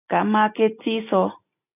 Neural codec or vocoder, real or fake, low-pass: none; real; 3.6 kHz